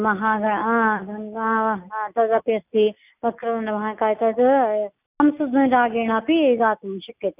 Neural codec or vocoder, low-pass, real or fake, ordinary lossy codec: none; 3.6 kHz; real; none